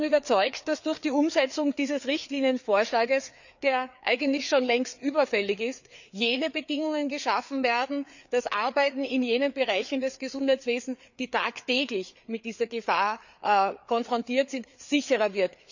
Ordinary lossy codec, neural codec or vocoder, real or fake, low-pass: none; codec, 16 kHz, 4 kbps, FreqCodec, larger model; fake; 7.2 kHz